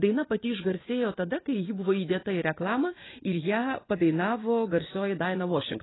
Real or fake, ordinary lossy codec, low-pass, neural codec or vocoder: fake; AAC, 16 kbps; 7.2 kHz; vocoder, 24 kHz, 100 mel bands, Vocos